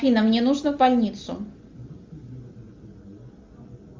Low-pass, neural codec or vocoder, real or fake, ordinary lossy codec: 7.2 kHz; none; real; Opus, 32 kbps